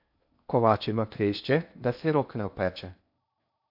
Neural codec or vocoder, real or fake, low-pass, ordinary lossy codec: codec, 16 kHz in and 24 kHz out, 0.6 kbps, FocalCodec, streaming, 2048 codes; fake; 5.4 kHz; AAC, 48 kbps